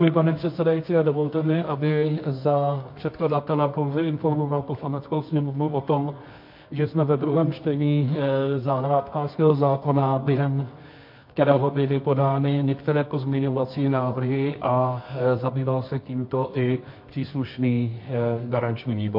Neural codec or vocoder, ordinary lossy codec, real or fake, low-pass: codec, 24 kHz, 0.9 kbps, WavTokenizer, medium music audio release; MP3, 32 kbps; fake; 5.4 kHz